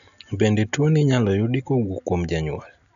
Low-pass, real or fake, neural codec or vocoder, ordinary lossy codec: 7.2 kHz; real; none; none